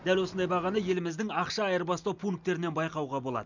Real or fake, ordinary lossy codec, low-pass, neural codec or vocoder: real; none; 7.2 kHz; none